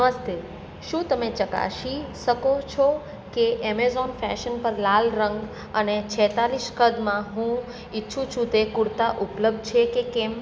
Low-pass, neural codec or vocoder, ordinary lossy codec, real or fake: none; none; none; real